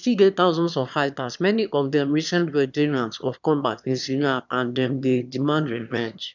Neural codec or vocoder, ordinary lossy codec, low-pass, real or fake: autoencoder, 22.05 kHz, a latent of 192 numbers a frame, VITS, trained on one speaker; none; 7.2 kHz; fake